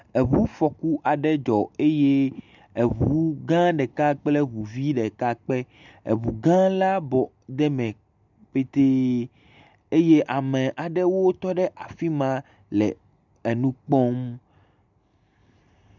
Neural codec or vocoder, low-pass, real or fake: none; 7.2 kHz; real